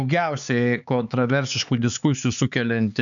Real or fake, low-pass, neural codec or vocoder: fake; 7.2 kHz; codec, 16 kHz, 4 kbps, X-Codec, HuBERT features, trained on LibriSpeech